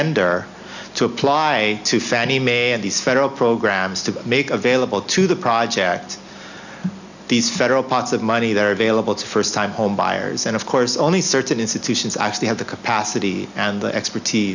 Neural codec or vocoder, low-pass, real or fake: none; 7.2 kHz; real